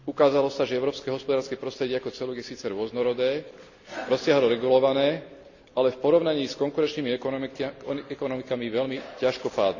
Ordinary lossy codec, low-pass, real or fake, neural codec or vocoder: none; 7.2 kHz; real; none